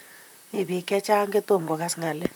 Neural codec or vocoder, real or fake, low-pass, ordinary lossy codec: vocoder, 44.1 kHz, 128 mel bands, Pupu-Vocoder; fake; none; none